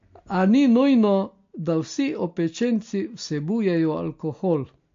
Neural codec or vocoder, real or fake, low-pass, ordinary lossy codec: none; real; 7.2 kHz; MP3, 48 kbps